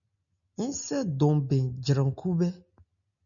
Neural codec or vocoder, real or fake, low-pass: none; real; 7.2 kHz